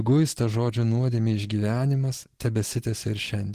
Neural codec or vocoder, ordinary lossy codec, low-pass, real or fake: none; Opus, 16 kbps; 14.4 kHz; real